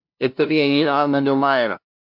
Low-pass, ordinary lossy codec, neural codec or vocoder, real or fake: 5.4 kHz; MP3, 48 kbps; codec, 16 kHz, 0.5 kbps, FunCodec, trained on LibriTTS, 25 frames a second; fake